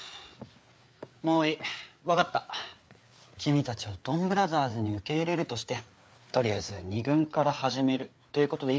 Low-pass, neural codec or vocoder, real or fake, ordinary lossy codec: none; codec, 16 kHz, 4 kbps, FreqCodec, larger model; fake; none